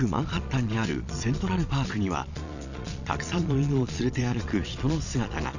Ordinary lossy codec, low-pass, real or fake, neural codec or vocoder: none; 7.2 kHz; fake; vocoder, 22.05 kHz, 80 mel bands, Vocos